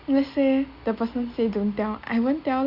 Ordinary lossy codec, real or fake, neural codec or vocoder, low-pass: Opus, 64 kbps; real; none; 5.4 kHz